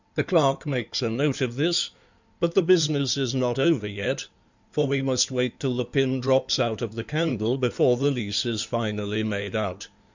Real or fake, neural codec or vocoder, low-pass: fake; codec, 16 kHz in and 24 kHz out, 2.2 kbps, FireRedTTS-2 codec; 7.2 kHz